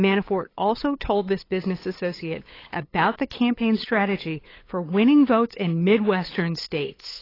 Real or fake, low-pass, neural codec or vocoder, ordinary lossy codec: fake; 5.4 kHz; codec, 16 kHz, 8 kbps, FunCodec, trained on LibriTTS, 25 frames a second; AAC, 24 kbps